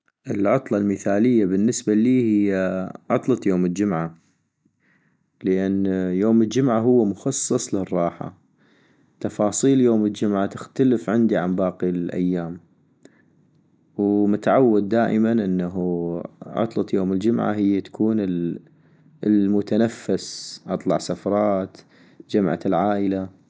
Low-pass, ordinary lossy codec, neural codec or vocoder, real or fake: none; none; none; real